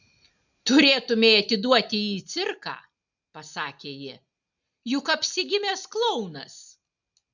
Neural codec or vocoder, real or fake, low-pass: none; real; 7.2 kHz